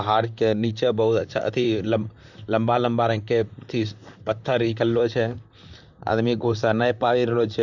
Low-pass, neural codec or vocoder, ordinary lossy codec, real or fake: 7.2 kHz; vocoder, 44.1 kHz, 128 mel bands, Pupu-Vocoder; none; fake